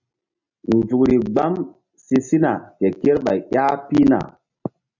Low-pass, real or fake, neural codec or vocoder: 7.2 kHz; real; none